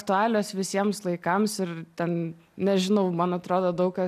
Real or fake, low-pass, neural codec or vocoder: real; 14.4 kHz; none